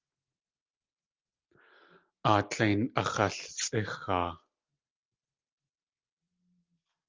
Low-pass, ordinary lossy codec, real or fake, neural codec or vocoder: 7.2 kHz; Opus, 32 kbps; real; none